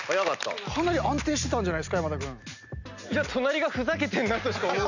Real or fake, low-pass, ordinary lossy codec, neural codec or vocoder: real; 7.2 kHz; none; none